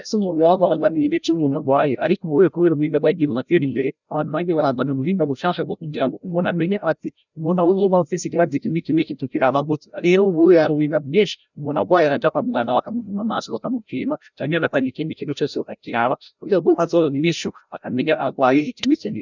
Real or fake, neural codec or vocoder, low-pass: fake; codec, 16 kHz, 0.5 kbps, FreqCodec, larger model; 7.2 kHz